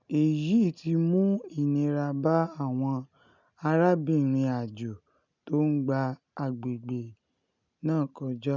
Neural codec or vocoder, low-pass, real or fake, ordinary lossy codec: none; 7.2 kHz; real; none